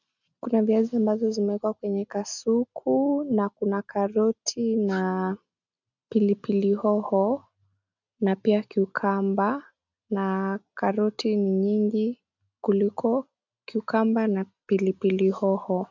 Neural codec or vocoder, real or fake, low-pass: none; real; 7.2 kHz